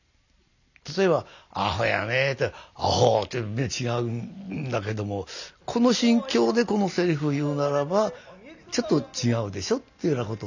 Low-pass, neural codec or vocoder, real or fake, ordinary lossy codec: 7.2 kHz; none; real; none